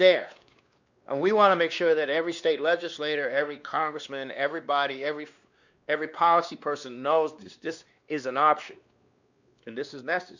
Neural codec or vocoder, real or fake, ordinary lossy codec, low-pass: codec, 16 kHz, 2 kbps, X-Codec, WavLM features, trained on Multilingual LibriSpeech; fake; Opus, 64 kbps; 7.2 kHz